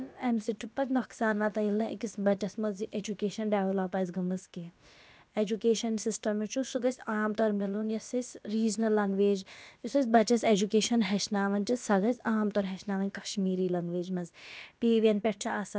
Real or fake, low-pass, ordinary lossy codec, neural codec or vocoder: fake; none; none; codec, 16 kHz, about 1 kbps, DyCAST, with the encoder's durations